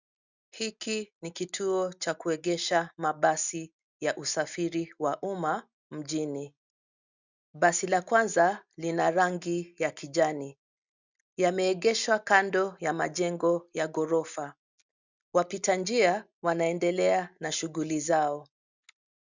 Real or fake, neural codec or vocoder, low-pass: real; none; 7.2 kHz